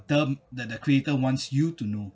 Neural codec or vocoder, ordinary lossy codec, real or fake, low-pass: none; none; real; none